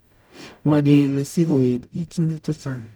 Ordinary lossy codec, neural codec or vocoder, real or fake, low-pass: none; codec, 44.1 kHz, 0.9 kbps, DAC; fake; none